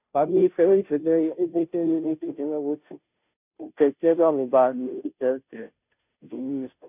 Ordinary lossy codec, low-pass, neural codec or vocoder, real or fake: none; 3.6 kHz; codec, 16 kHz, 0.5 kbps, FunCodec, trained on Chinese and English, 25 frames a second; fake